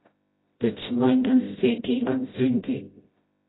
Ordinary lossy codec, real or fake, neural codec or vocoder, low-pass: AAC, 16 kbps; fake; codec, 16 kHz, 0.5 kbps, FreqCodec, smaller model; 7.2 kHz